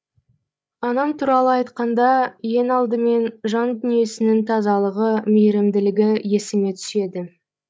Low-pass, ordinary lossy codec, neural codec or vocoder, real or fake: none; none; codec, 16 kHz, 8 kbps, FreqCodec, larger model; fake